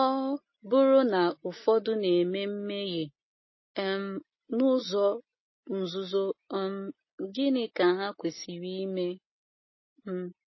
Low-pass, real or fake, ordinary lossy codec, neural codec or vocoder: 7.2 kHz; real; MP3, 24 kbps; none